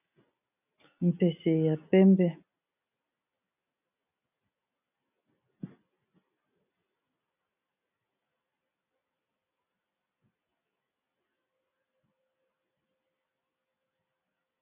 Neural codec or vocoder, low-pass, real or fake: none; 3.6 kHz; real